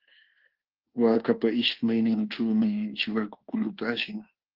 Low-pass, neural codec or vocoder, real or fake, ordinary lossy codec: 5.4 kHz; codec, 24 kHz, 1.2 kbps, DualCodec; fake; Opus, 16 kbps